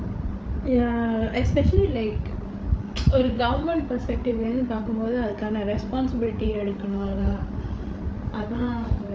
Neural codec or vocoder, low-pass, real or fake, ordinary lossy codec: codec, 16 kHz, 8 kbps, FreqCodec, larger model; none; fake; none